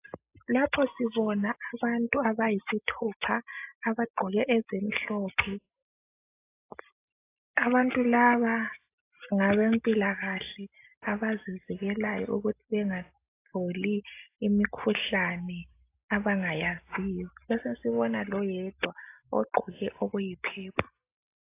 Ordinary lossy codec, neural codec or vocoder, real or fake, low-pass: AAC, 24 kbps; none; real; 3.6 kHz